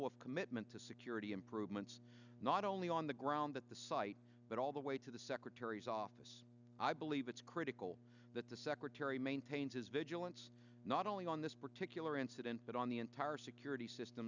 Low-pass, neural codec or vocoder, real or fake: 7.2 kHz; none; real